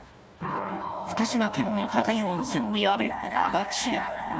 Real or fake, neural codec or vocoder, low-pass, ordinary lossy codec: fake; codec, 16 kHz, 1 kbps, FunCodec, trained on Chinese and English, 50 frames a second; none; none